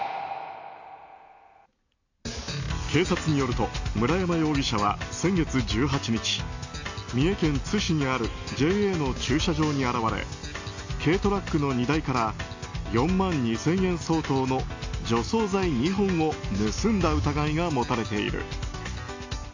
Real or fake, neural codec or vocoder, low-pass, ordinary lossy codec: real; none; 7.2 kHz; AAC, 48 kbps